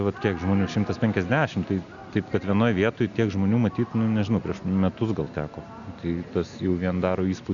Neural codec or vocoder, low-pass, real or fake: none; 7.2 kHz; real